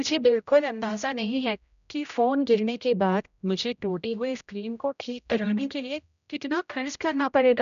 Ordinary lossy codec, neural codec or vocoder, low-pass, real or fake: none; codec, 16 kHz, 0.5 kbps, X-Codec, HuBERT features, trained on general audio; 7.2 kHz; fake